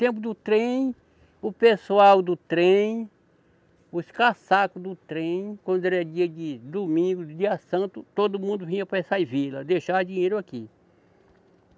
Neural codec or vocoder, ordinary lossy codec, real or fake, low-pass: none; none; real; none